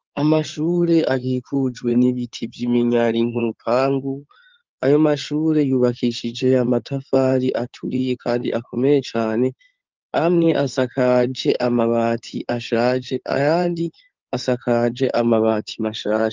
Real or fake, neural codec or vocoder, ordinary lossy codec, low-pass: fake; codec, 16 kHz in and 24 kHz out, 2.2 kbps, FireRedTTS-2 codec; Opus, 32 kbps; 7.2 kHz